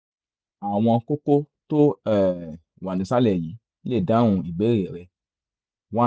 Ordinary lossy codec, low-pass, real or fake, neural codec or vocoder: none; none; real; none